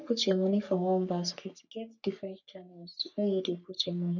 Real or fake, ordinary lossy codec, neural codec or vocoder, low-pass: fake; none; codec, 44.1 kHz, 3.4 kbps, Pupu-Codec; 7.2 kHz